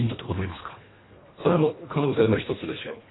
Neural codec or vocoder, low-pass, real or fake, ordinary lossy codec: codec, 24 kHz, 1.5 kbps, HILCodec; 7.2 kHz; fake; AAC, 16 kbps